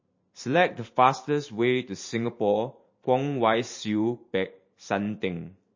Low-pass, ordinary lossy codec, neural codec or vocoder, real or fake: 7.2 kHz; MP3, 32 kbps; vocoder, 44.1 kHz, 128 mel bands every 512 samples, BigVGAN v2; fake